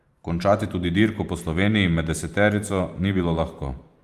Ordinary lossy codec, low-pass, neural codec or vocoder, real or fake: Opus, 32 kbps; 14.4 kHz; vocoder, 44.1 kHz, 128 mel bands every 512 samples, BigVGAN v2; fake